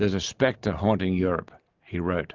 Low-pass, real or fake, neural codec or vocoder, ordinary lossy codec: 7.2 kHz; real; none; Opus, 32 kbps